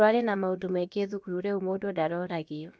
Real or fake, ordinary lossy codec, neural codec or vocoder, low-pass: fake; none; codec, 16 kHz, about 1 kbps, DyCAST, with the encoder's durations; none